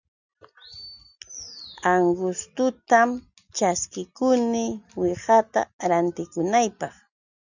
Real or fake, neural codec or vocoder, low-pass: real; none; 7.2 kHz